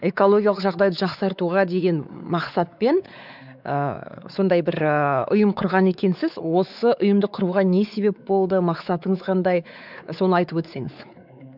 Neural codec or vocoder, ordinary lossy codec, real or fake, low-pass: codec, 16 kHz, 4 kbps, X-Codec, WavLM features, trained on Multilingual LibriSpeech; none; fake; 5.4 kHz